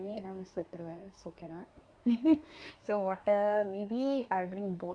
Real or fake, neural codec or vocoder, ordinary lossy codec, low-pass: fake; codec, 24 kHz, 1 kbps, SNAC; none; 9.9 kHz